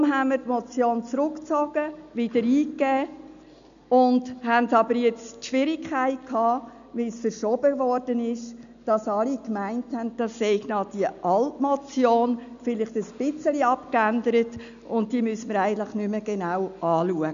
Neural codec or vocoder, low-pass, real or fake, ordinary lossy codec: none; 7.2 kHz; real; none